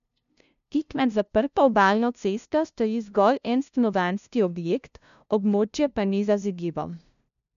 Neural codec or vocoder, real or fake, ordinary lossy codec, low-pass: codec, 16 kHz, 0.5 kbps, FunCodec, trained on LibriTTS, 25 frames a second; fake; none; 7.2 kHz